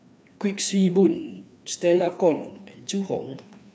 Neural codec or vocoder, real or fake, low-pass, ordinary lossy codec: codec, 16 kHz, 2 kbps, FreqCodec, larger model; fake; none; none